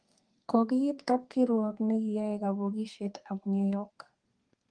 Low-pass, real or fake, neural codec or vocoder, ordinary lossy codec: 9.9 kHz; fake; codec, 32 kHz, 1.9 kbps, SNAC; Opus, 24 kbps